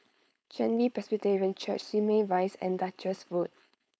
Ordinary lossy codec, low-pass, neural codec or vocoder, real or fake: none; none; codec, 16 kHz, 4.8 kbps, FACodec; fake